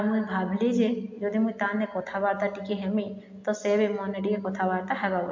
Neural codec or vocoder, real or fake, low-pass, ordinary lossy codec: none; real; 7.2 kHz; MP3, 48 kbps